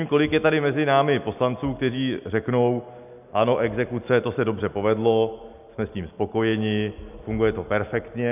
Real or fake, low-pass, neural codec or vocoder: real; 3.6 kHz; none